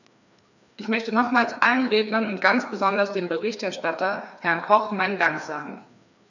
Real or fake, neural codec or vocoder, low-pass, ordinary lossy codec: fake; codec, 16 kHz, 2 kbps, FreqCodec, larger model; 7.2 kHz; none